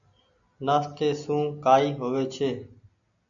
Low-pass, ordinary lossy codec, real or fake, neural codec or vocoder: 7.2 kHz; MP3, 96 kbps; real; none